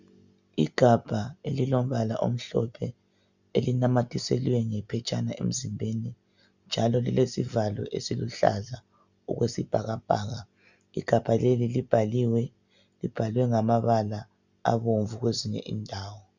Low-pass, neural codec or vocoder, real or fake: 7.2 kHz; none; real